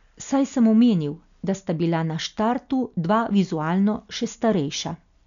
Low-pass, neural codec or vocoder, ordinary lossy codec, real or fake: 7.2 kHz; none; none; real